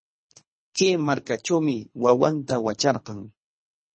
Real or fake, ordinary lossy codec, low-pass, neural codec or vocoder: fake; MP3, 32 kbps; 9.9 kHz; codec, 24 kHz, 3 kbps, HILCodec